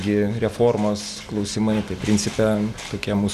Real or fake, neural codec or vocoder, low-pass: real; none; 14.4 kHz